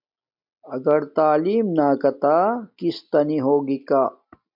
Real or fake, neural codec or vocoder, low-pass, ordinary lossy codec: real; none; 5.4 kHz; MP3, 48 kbps